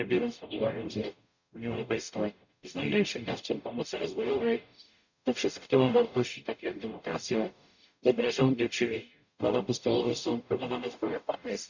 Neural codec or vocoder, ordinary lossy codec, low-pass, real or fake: codec, 44.1 kHz, 0.9 kbps, DAC; none; 7.2 kHz; fake